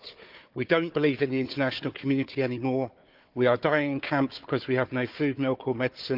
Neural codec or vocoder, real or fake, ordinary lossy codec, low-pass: codec, 16 kHz, 4 kbps, FunCodec, trained on Chinese and English, 50 frames a second; fake; Opus, 24 kbps; 5.4 kHz